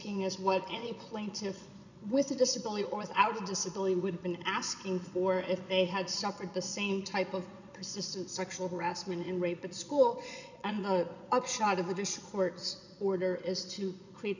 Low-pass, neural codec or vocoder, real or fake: 7.2 kHz; vocoder, 22.05 kHz, 80 mel bands, Vocos; fake